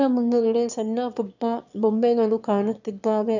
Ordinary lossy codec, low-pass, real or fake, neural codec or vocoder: none; 7.2 kHz; fake; autoencoder, 22.05 kHz, a latent of 192 numbers a frame, VITS, trained on one speaker